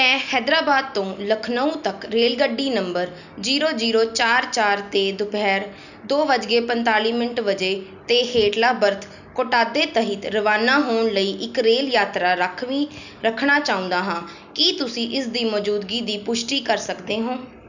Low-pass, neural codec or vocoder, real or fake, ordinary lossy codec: 7.2 kHz; none; real; none